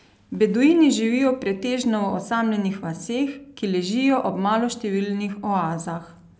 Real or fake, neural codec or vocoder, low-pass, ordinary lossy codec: real; none; none; none